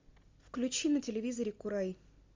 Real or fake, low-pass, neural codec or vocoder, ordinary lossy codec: real; 7.2 kHz; none; MP3, 64 kbps